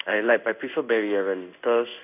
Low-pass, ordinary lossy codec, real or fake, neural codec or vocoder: 3.6 kHz; none; fake; codec, 16 kHz in and 24 kHz out, 1 kbps, XY-Tokenizer